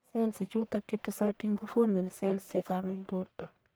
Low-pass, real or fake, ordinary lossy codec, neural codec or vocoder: none; fake; none; codec, 44.1 kHz, 1.7 kbps, Pupu-Codec